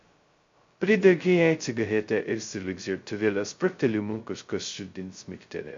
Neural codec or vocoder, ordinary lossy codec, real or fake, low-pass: codec, 16 kHz, 0.2 kbps, FocalCodec; MP3, 48 kbps; fake; 7.2 kHz